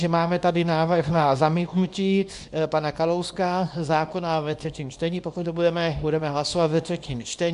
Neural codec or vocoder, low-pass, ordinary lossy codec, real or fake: codec, 24 kHz, 0.9 kbps, WavTokenizer, small release; 10.8 kHz; AAC, 96 kbps; fake